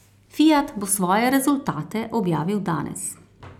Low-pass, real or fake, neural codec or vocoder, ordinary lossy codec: 19.8 kHz; real; none; none